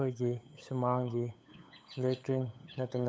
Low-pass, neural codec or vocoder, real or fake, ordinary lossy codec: none; codec, 16 kHz, 8 kbps, FunCodec, trained on LibriTTS, 25 frames a second; fake; none